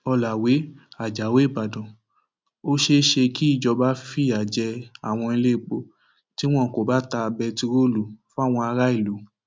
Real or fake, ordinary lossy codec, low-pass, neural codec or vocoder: real; none; none; none